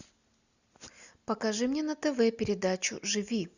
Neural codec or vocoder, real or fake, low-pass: none; real; 7.2 kHz